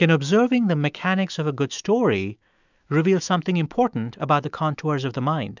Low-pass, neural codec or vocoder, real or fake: 7.2 kHz; autoencoder, 48 kHz, 128 numbers a frame, DAC-VAE, trained on Japanese speech; fake